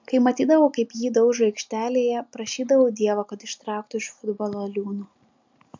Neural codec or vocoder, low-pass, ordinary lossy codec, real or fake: none; 7.2 kHz; MP3, 64 kbps; real